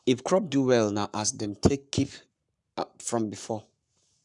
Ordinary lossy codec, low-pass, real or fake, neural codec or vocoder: none; 10.8 kHz; fake; codec, 44.1 kHz, 7.8 kbps, Pupu-Codec